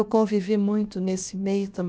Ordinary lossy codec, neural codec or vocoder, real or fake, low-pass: none; codec, 16 kHz, about 1 kbps, DyCAST, with the encoder's durations; fake; none